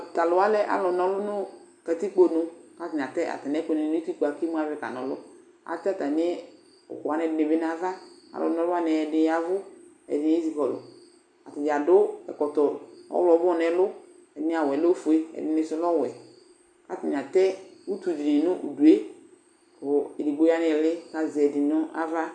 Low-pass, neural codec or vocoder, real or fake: 9.9 kHz; none; real